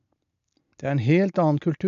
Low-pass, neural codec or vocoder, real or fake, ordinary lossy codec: 7.2 kHz; none; real; none